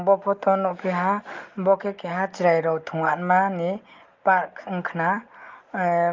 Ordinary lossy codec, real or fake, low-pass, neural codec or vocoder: Opus, 32 kbps; real; 7.2 kHz; none